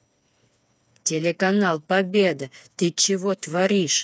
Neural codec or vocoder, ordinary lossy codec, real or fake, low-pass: codec, 16 kHz, 4 kbps, FreqCodec, smaller model; none; fake; none